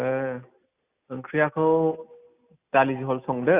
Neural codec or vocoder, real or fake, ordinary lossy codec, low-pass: none; real; Opus, 64 kbps; 3.6 kHz